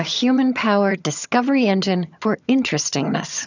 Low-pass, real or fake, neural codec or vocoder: 7.2 kHz; fake; vocoder, 22.05 kHz, 80 mel bands, HiFi-GAN